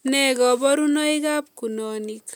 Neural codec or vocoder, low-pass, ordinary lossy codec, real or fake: none; none; none; real